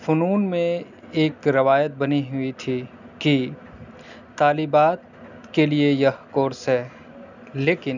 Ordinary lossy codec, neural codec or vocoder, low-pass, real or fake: none; none; 7.2 kHz; real